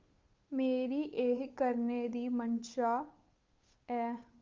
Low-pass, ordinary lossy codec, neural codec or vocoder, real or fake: 7.2 kHz; Opus, 32 kbps; codec, 16 kHz, 8 kbps, FunCodec, trained on Chinese and English, 25 frames a second; fake